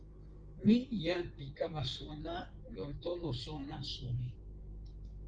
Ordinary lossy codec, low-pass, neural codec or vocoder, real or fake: Opus, 32 kbps; 9.9 kHz; codec, 16 kHz in and 24 kHz out, 1.1 kbps, FireRedTTS-2 codec; fake